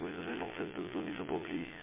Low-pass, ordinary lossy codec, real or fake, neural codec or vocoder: 3.6 kHz; MP3, 16 kbps; fake; vocoder, 22.05 kHz, 80 mel bands, Vocos